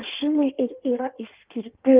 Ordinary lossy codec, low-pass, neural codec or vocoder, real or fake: Opus, 24 kbps; 3.6 kHz; codec, 16 kHz in and 24 kHz out, 1.1 kbps, FireRedTTS-2 codec; fake